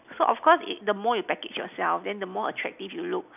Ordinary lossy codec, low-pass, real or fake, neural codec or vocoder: none; 3.6 kHz; real; none